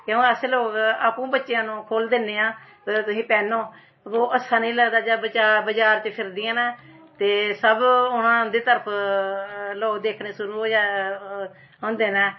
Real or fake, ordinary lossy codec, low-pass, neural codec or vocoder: real; MP3, 24 kbps; 7.2 kHz; none